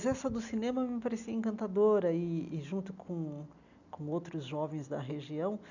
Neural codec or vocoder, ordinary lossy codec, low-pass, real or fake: none; none; 7.2 kHz; real